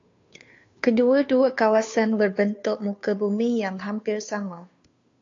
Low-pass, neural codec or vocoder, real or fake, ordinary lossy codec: 7.2 kHz; codec, 16 kHz, 2 kbps, FunCodec, trained on Chinese and English, 25 frames a second; fake; AAC, 48 kbps